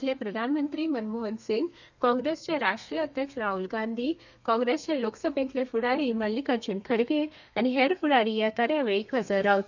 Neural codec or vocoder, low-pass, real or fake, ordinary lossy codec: codec, 32 kHz, 1.9 kbps, SNAC; 7.2 kHz; fake; none